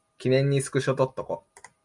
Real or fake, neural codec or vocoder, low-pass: real; none; 10.8 kHz